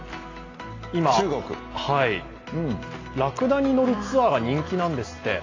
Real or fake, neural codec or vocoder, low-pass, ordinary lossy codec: real; none; 7.2 kHz; AAC, 32 kbps